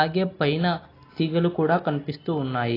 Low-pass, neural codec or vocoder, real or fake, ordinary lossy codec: 5.4 kHz; none; real; AAC, 24 kbps